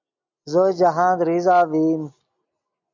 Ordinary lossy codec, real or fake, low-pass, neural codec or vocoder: MP3, 64 kbps; real; 7.2 kHz; none